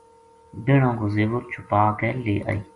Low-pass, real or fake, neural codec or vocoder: 10.8 kHz; real; none